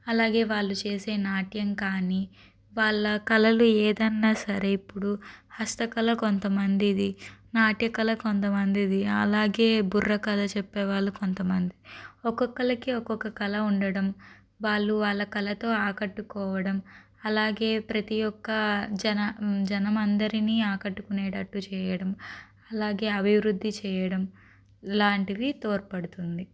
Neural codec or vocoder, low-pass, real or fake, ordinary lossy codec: none; none; real; none